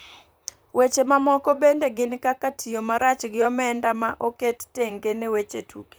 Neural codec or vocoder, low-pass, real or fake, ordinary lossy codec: vocoder, 44.1 kHz, 128 mel bands, Pupu-Vocoder; none; fake; none